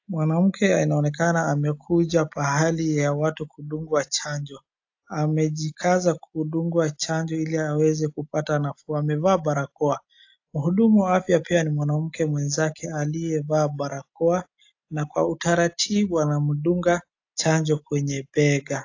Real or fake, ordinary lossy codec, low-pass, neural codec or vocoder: real; AAC, 48 kbps; 7.2 kHz; none